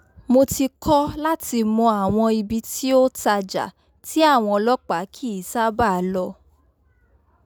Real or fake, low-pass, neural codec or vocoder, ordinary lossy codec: real; none; none; none